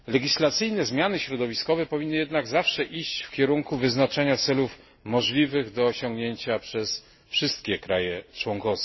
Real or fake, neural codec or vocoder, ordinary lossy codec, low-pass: real; none; MP3, 24 kbps; 7.2 kHz